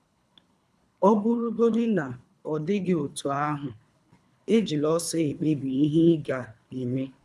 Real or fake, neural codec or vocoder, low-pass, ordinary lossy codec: fake; codec, 24 kHz, 3 kbps, HILCodec; none; none